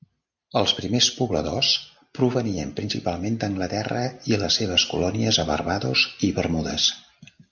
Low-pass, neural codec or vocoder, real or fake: 7.2 kHz; none; real